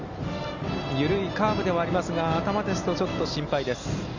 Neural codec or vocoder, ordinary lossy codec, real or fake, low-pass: none; none; real; 7.2 kHz